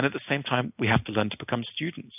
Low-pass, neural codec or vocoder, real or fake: 3.6 kHz; none; real